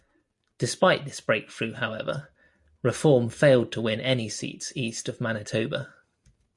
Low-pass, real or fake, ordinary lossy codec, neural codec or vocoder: 10.8 kHz; real; MP3, 64 kbps; none